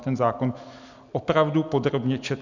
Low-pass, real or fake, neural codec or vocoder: 7.2 kHz; real; none